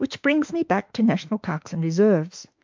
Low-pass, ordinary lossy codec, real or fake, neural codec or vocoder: 7.2 kHz; MP3, 64 kbps; fake; autoencoder, 48 kHz, 32 numbers a frame, DAC-VAE, trained on Japanese speech